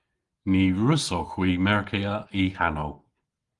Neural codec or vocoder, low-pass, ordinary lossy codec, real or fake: none; 10.8 kHz; Opus, 24 kbps; real